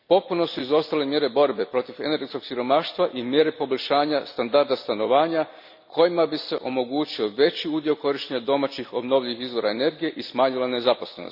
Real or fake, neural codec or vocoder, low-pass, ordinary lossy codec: real; none; 5.4 kHz; none